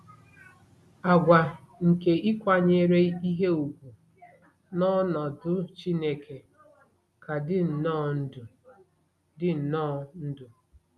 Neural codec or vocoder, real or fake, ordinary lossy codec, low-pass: none; real; none; none